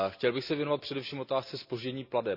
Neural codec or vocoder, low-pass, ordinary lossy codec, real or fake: none; 5.4 kHz; none; real